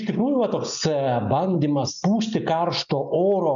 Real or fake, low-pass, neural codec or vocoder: real; 7.2 kHz; none